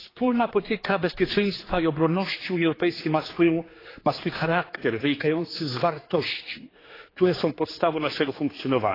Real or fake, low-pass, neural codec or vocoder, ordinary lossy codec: fake; 5.4 kHz; codec, 16 kHz, 4 kbps, X-Codec, HuBERT features, trained on general audio; AAC, 24 kbps